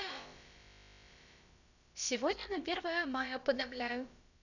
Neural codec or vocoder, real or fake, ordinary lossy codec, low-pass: codec, 16 kHz, about 1 kbps, DyCAST, with the encoder's durations; fake; none; 7.2 kHz